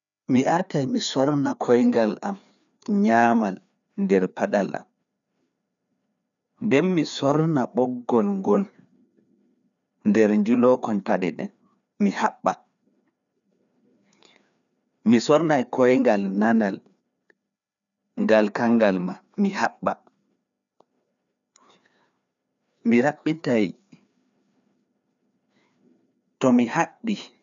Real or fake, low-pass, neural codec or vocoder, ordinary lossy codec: fake; 7.2 kHz; codec, 16 kHz, 2 kbps, FreqCodec, larger model; none